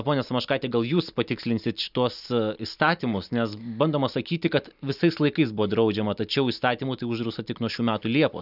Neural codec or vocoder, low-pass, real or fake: none; 5.4 kHz; real